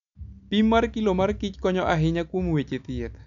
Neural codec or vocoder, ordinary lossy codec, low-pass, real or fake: none; none; 7.2 kHz; real